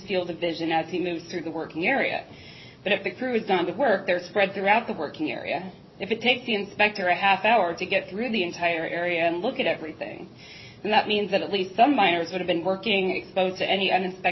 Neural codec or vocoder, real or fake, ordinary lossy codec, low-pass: none; real; MP3, 24 kbps; 7.2 kHz